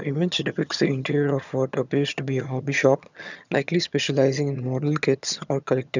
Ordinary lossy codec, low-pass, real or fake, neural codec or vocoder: none; 7.2 kHz; fake; vocoder, 22.05 kHz, 80 mel bands, HiFi-GAN